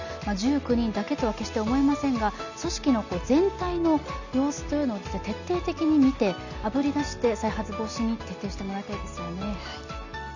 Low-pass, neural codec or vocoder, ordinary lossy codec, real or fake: 7.2 kHz; none; none; real